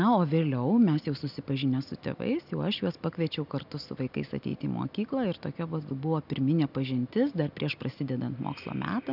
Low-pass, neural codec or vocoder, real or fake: 5.4 kHz; none; real